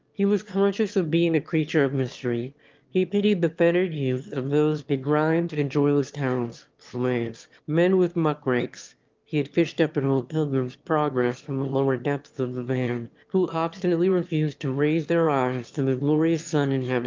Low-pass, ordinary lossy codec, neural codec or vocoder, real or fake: 7.2 kHz; Opus, 24 kbps; autoencoder, 22.05 kHz, a latent of 192 numbers a frame, VITS, trained on one speaker; fake